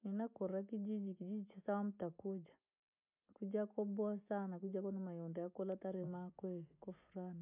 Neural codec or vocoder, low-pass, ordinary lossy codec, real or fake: none; 3.6 kHz; none; real